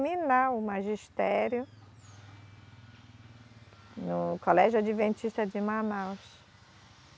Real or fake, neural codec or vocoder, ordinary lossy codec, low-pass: real; none; none; none